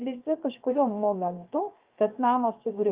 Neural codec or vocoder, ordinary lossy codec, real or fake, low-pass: codec, 16 kHz, 0.7 kbps, FocalCodec; Opus, 32 kbps; fake; 3.6 kHz